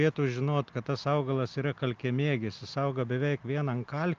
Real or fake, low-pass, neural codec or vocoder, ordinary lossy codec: real; 7.2 kHz; none; Opus, 24 kbps